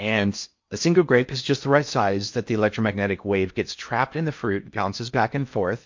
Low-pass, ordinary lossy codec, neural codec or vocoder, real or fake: 7.2 kHz; MP3, 48 kbps; codec, 16 kHz in and 24 kHz out, 0.6 kbps, FocalCodec, streaming, 4096 codes; fake